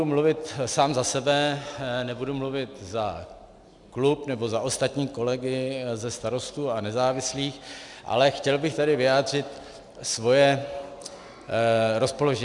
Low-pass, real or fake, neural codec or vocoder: 10.8 kHz; real; none